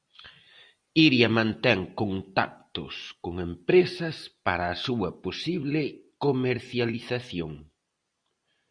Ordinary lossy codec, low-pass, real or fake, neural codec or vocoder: Opus, 64 kbps; 9.9 kHz; real; none